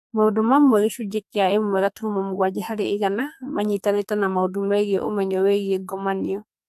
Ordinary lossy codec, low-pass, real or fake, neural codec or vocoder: AAC, 96 kbps; 14.4 kHz; fake; codec, 44.1 kHz, 2.6 kbps, SNAC